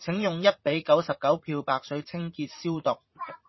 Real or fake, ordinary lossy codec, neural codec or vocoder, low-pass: fake; MP3, 24 kbps; vocoder, 44.1 kHz, 80 mel bands, Vocos; 7.2 kHz